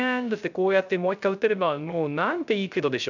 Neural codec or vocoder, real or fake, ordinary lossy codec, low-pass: codec, 16 kHz, 0.3 kbps, FocalCodec; fake; none; 7.2 kHz